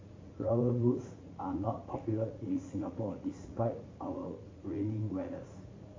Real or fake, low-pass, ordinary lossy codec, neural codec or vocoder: fake; 7.2 kHz; MP3, 32 kbps; vocoder, 44.1 kHz, 80 mel bands, Vocos